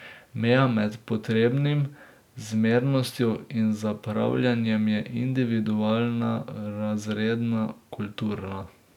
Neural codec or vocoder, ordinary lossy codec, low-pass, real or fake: none; none; 19.8 kHz; real